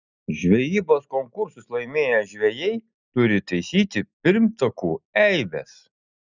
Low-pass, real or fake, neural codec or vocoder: 7.2 kHz; real; none